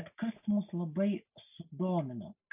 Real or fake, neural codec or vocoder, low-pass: real; none; 3.6 kHz